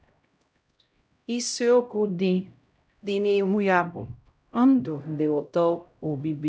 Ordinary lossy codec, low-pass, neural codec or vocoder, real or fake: none; none; codec, 16 kHz, 0.5 kbps, X-Codec, HuBERT features, trained on LibriSpeech; fake